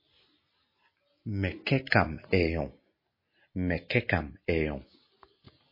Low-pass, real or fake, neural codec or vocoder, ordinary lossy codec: 5.4 kHz; real; none; MP3, 24 kbps